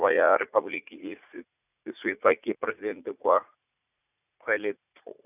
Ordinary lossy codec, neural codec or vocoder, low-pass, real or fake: none; vocoder, 44.1 kHz, 80 mel bands, Vocos; 3.6 kHz; fake